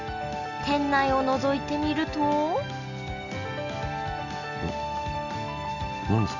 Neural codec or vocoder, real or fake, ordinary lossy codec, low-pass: none; real; none; 7.2 kHz